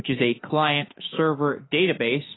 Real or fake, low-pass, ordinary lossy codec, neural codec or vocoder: fake; 7.2 kHz; AAC, 16 kbps; autoencoder, 48 kHz, 32 numbers a frame, DAC-VAE, trained on Japanese speech